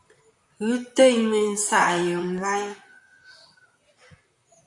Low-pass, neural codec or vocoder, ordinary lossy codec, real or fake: 10.8 kHz; vocoder, 44.1 kHz, 128 mel bands, Pupu-Vocoder; AAC, 64 kbps; fake